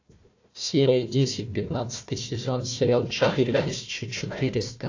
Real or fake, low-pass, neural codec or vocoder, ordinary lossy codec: fake; 7.2 kHz; codec, 16 kHz, 1 kbps, FunCodec, trained on Chinese and English, 50 frames a second; AAC, 48 kbps